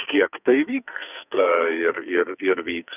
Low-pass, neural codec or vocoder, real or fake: 3.6 kHz; codec, 44.1 kHz, 2.6 kbps, SNAC; fake